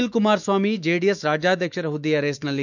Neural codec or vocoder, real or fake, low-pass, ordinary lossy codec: autoencoder, 48 kHz, 128 numbers a frame, DAC-VAE, trained on Japanese speech; fake; 7.2 kHz; none